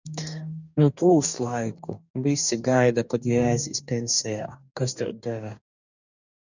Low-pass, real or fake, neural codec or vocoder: 7.2 kHz; fake; codec, 44.1 kHz, 2.6 kbps, DAC